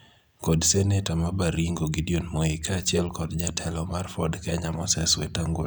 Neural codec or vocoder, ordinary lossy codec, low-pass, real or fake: none; none; none; real